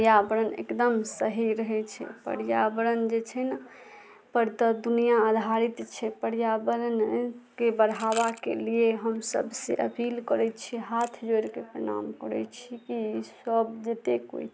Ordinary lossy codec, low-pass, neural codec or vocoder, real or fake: none; none; none; real